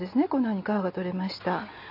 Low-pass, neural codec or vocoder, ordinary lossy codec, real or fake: 5.4 kHz; none; none; real